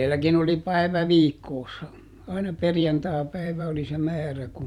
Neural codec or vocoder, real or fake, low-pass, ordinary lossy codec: vocoder, 44.1 kHz, 128 mel bands every 512 samples, BigVGAN v2; fake; 19.8 kHz; none